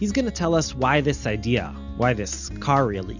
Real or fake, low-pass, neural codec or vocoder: real; 7.2 kHz; none